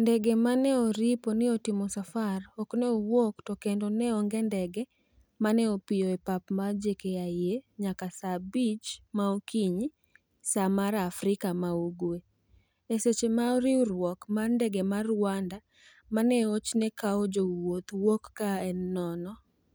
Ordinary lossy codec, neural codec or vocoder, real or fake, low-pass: none; none; real; none